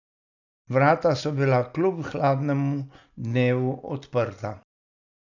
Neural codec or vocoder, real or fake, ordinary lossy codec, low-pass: none; real; none; 7.2 kHz